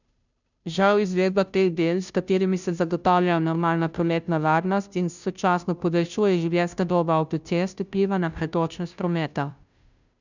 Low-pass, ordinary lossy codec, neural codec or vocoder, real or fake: 7.2 kHz; none; codec, 16 kHz, 0.5 kbps, FunCodec, trained on Chinese and English, 25 frames a second; fake